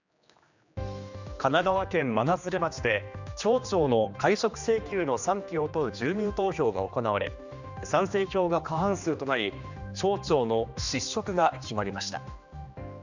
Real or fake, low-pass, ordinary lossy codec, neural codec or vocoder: fake; 7.2 kHz; none; codec, 16 kHz, 2 kbps, X-Codec, HuBERT features, trained on general audio